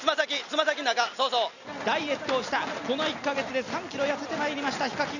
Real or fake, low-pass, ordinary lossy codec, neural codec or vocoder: real; 7.2 kHz; none; none